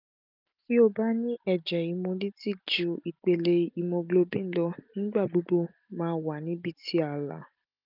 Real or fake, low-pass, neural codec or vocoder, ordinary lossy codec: real; 5.4 kHz; none; none